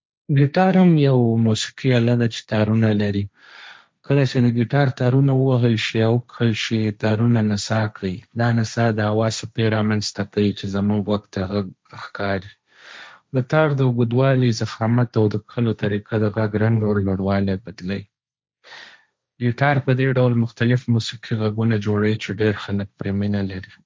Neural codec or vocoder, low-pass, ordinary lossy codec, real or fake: codec, 16 kHz, 1.1 kbps, Voila-Tokenizer; none; none; fake